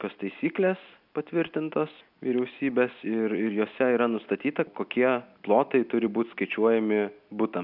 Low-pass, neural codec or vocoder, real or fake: 5.4 kHz; none; real